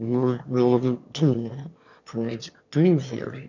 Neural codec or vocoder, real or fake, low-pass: autoencoder, 22.05 kHz, a latent of 192 numbers a frame, VITS, trained on one speaker; fake; 7.2 kHz